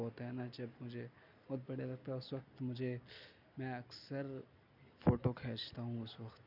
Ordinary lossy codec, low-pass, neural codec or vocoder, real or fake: none; 5.4 kHz; none; real